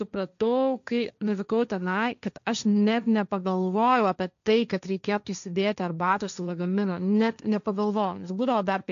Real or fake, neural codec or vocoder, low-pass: fake; codec, 16 kHz, 1.1 kbps, Voila-Tokenizer; 7.2 kHz